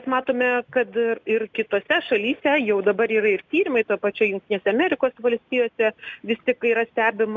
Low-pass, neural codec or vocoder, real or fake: 7.2 kHz; none; real